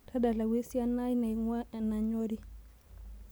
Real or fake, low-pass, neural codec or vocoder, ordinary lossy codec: real; none; none; none